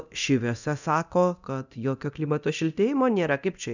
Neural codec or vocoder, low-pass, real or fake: codec, 24 kHz, 0.9 kbps, DualCodec; 7.2 kHz; fake